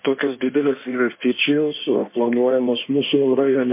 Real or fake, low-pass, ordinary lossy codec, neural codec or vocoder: fake; 3.6 kHz; MP3, 24 kbps; codec, 16 kHz in and 24 kHz out, 1.1 kbps, FireRedTTS-2 codec